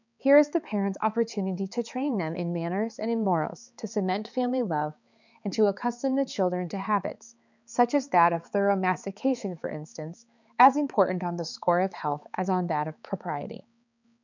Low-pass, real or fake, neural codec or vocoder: 7.2 kHz; fake; codec, 16 kHz, 4 kbps, X-Codec, HuBERT features, trained on balanced general audio